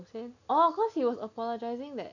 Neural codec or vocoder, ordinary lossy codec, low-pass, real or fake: none; none; 7.2 kHz; real